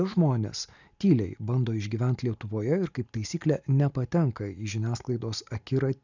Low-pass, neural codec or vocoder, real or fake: 7.2 kHz; none; real